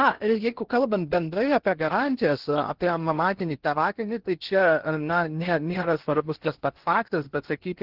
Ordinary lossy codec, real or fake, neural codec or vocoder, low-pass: Opus, 16 kbps; fake; codec, 16 kHz in and 24 kHz out, 0.6 kbps, FocalCodec, streaming, 2048 codes; 5.4 kHz